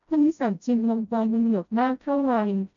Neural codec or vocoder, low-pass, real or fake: codec, 16 kHz, 0.5 kbps, FreqCodec, smaller model; 7.2 kHz; fake